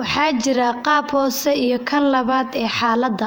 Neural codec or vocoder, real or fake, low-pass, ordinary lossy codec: vocoder, 48 kHz, 128 mel bands, Vocos; fake; 19.8 kHz; none